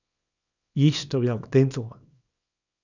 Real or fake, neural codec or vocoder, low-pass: fake; codec, 24 kHz, 0.9 kbps, WavTokenizer, small release; 7.2 kHz